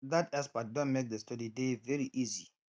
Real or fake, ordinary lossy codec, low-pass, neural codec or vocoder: real; none; none; none